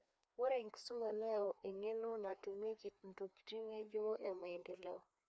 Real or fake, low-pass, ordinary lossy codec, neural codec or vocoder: fake; none; none; codec, 16 kHz, 2 kbps, FreqCodec, larger model